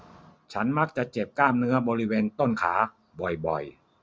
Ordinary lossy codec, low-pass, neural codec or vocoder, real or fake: none; none; none; real